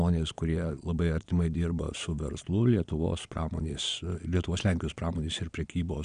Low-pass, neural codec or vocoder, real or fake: 9.9 kHz; vocoder, 22.05 kHz, 80 mel bands, Vocos; fake